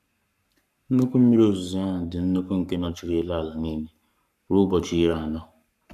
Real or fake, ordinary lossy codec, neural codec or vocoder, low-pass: fake; none; codec, 44.1 kHz, 7.8 kbps, Pupu-Codec; 14.4 kHz